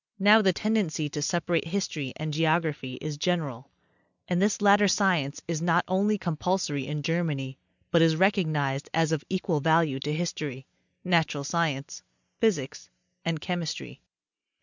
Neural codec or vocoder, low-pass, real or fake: none; 7.2 kHz; real